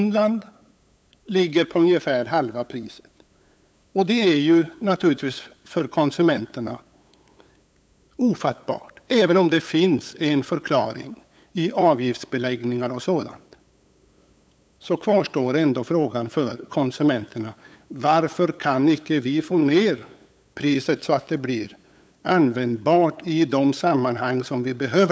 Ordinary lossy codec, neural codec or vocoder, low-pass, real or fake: none; codec, 16 kHz, 8 kbps, FunCodec, trained on LibriTTS, 25 frames a second; none; fake